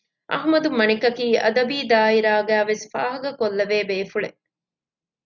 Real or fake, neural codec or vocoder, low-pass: real; none; 7.2 kHz